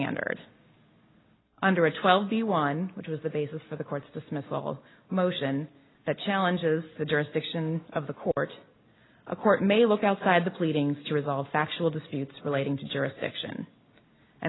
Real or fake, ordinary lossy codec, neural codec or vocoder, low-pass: real; AAC, 16 kbps; none; 7.2 kHz